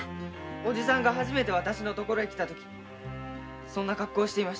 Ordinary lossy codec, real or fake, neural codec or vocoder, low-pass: none; real; none; none